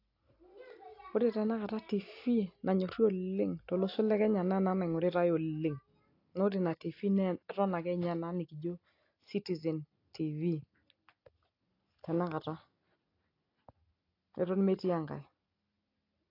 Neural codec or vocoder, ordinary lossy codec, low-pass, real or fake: none; AAC, 32 kbps; 5.4 kHz; real